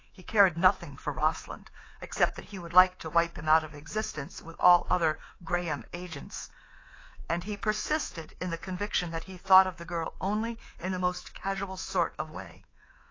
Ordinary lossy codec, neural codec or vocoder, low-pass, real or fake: AAC, 32 kbps; codec, 24 kHz, 3.1 kbps, DualCodec; 7.2 kHz; fake